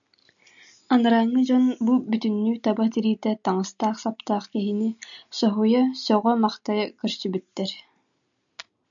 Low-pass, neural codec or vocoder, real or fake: 7.2 kHz; none; real